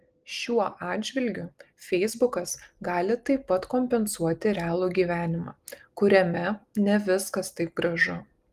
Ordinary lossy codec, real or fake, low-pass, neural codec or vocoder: Opus, 24 kbps; real; 14.4 kHz; none